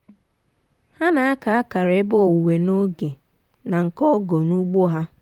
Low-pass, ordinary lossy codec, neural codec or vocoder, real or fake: 19.8 kHz; Opus, 32 kbps; vocoder, 44.1 kHz, 128 mel bands, Pupu-Vocoder; fake